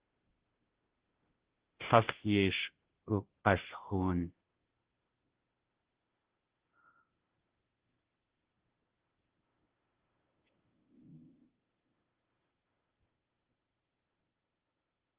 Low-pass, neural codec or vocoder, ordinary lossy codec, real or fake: 3.6 kHz; codec, 16 kHz, 0.5 kbps, FunCodec, trained on Chinese and English, 25 frames a second; Opus, 16 kbps; fake